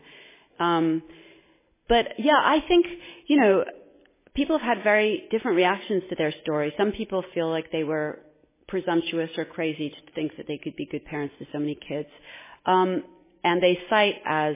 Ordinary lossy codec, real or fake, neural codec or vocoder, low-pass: MP3, 16 kbps; real; none; 3.6 kHz